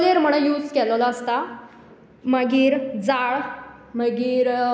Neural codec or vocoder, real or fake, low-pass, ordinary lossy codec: none; real; none; none